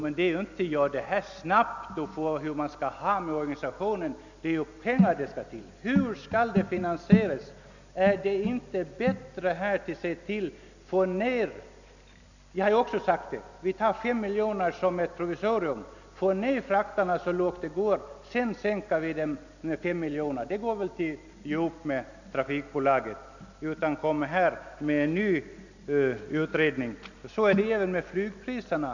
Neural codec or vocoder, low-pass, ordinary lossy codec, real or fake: none; 7.2 kHz; none; real